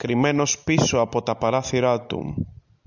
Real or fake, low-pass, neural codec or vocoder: real; 7.2 kHz; none